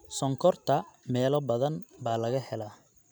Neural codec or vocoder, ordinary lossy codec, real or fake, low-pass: none; none; real; none